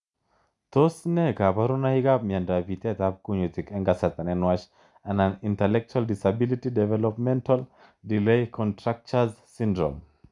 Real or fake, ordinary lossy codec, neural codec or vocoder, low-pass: real; none; none; 10.8 kHz